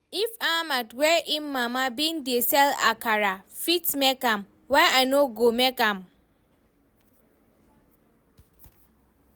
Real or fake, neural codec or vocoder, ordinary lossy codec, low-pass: real; none; none; none